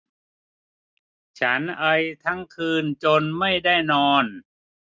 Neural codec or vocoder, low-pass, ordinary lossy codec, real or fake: none; none; none; real